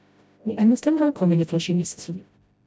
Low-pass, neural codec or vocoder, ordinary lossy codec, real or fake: none; codec, 16 kHz, 0.5 kbps, FreqCodec, smaller model; none; fake